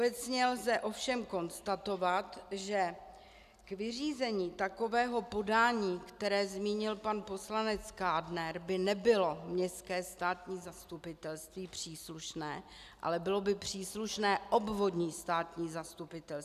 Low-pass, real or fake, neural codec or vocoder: 14.4 kHz; real; none